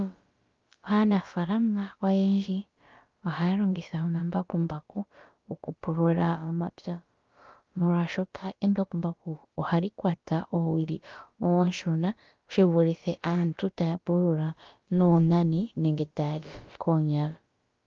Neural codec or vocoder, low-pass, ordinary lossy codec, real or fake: codec, 16 kHz, about 1 kbps, DyCAST, with the encoder's durations; 7.2 kHz; Opus, 32 kbps; fake